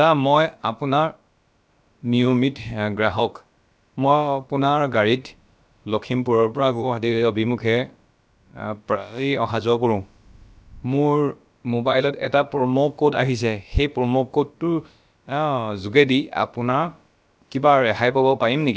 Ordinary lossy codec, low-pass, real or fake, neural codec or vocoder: none; none; fake; codec, 16 kHz, about 1 kbps, DyCAST, with the encoder's durations